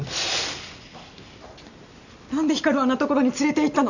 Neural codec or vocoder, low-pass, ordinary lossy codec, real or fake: none; 7.2 kHz; none; real